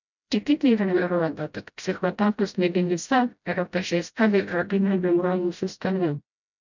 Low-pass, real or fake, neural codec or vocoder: 7.2 kHz; fake; codec, 16 kHz, 0.5 kbps, FreqCodec, smaller model